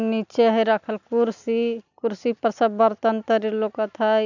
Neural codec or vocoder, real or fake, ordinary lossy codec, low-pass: none; real; none; 7.2 kHz